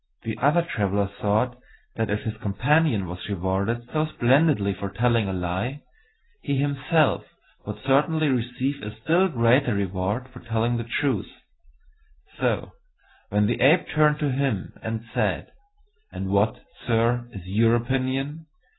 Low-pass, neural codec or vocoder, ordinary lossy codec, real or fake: 7.2 kHz; none; AAC, 16 kbps; real